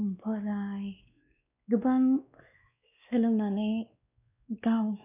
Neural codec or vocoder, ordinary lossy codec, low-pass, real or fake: codec, 16 kHz, 2 kbps, X-Codec, WavLM features, trained on Multilingual LibriSpeech; none; 3.6 kHz; fake